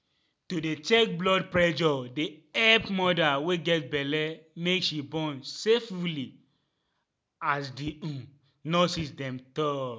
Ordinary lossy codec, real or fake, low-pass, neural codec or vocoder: none; real; none; none